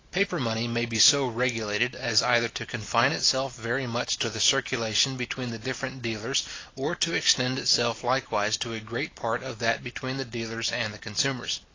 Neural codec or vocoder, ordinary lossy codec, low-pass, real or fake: none; AAC, 32 kbps; 7.2 kHz; real